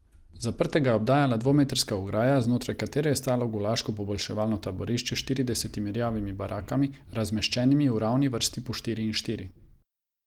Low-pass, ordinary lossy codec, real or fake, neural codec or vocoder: 19.8 kHz; Opus, 24 kbps; real; none